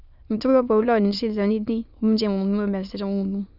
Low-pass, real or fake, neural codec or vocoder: 5.4 kHz; fake; autoencoder, 22.05 kHz, a latent of 192 numbers a frame, VITS, trained on many speakers